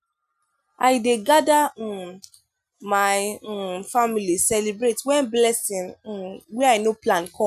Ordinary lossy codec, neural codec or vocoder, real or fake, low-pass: none; none; real; 14.4 kHz